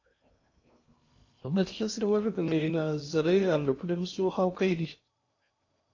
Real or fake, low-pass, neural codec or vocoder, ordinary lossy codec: fake; 7.2 kHz; codec, 16 kHz in and 24 kHz out, 0.8 kbps, FocalCodec, streaming, 65536 codes; AAC, 48 kbps